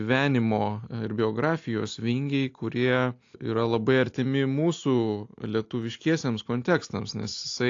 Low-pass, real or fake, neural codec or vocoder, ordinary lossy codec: 7.2 kHz; real; none; AAC, 48 kbps